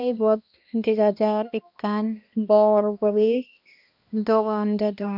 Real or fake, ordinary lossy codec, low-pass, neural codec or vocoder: fake; none; 5.4 kHz; codec, 16 kHz, 1 kbps, X-Codec, HuBERT features, trained on balanced general audio